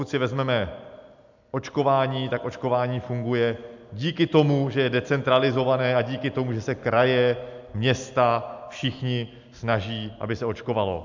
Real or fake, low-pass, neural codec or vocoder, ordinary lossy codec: real; 7.2 kHz; none; MP3, 64 kbps